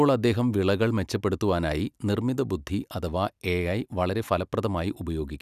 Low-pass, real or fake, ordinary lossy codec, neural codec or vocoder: 14.4 kHz; real; none; none